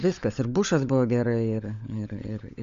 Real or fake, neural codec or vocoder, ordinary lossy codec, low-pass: fake; codec, 16 kHz, 16 kbps, FunCodec, trained on LibriTTS, 50 frames a second; MP3, 64 kbps; 7.2 kHz